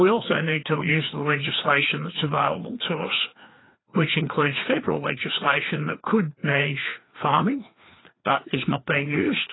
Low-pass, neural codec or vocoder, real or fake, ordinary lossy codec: 7.2 kHz; codec, 16 kHz, 2 kbps, FreqCodec, larger model; fake; AAC, 16 kbps